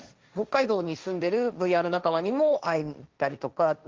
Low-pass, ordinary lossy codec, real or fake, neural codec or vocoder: 7.2 kHz; Opus, 32 kbps; fake; codec, 16 kHz, 1.1 kbps, Voila-Tokenizer